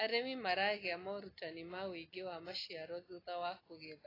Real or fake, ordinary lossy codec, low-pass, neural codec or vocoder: real; AAC, 24 kbps; 5.4 kHz; none